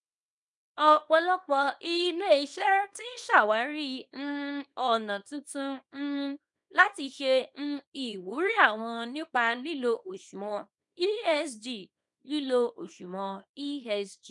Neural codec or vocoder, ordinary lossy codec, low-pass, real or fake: codec, 24 kHz, 0.9 kbps, WavTokenizer, small release; none; 10.8 kHz; fake